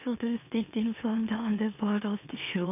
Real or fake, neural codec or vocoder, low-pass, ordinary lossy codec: fake; autoencoder, 44.1 kHz, a latent of 192 numbers a frame, MeloTTS; 3.6 kHz; none